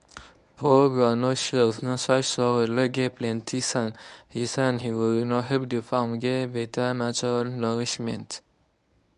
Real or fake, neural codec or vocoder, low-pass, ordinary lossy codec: fake; codec, 24 kHz, 0.9 kbps, WavTokenizer, medium speech release version 1; 10.8 kHz; none